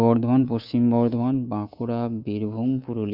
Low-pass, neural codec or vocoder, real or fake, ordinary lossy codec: 5.4 kHz; codec, 24 kHz, 3.1 kbps, DualCodec; fake; Opus, 64 kbps